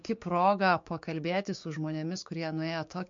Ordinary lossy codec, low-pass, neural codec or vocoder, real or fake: MP3, 48 kbps; 7.2 kHz; codec, 16 kHz, 6 kbps, DAC; fake